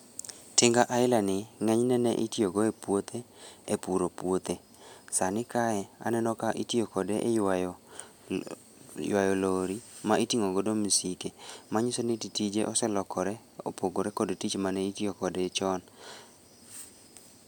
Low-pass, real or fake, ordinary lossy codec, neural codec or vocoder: none; real; none; none